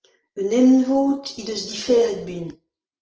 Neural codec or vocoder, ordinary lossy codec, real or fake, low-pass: none; Opus, 16 kbps; real; 7.2 kHz